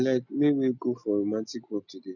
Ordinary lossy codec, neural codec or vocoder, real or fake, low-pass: none; none; real; 7.2 kHz